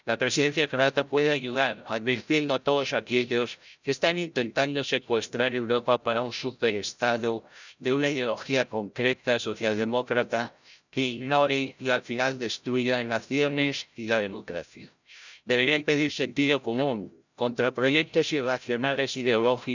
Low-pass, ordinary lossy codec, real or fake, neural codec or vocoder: 7.2 kHz; none; fake; codec, 16 kHz, 0.5 kbps, FreqCodec, larger model